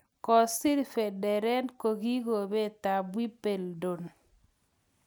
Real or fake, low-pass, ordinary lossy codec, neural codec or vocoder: real; none; none; none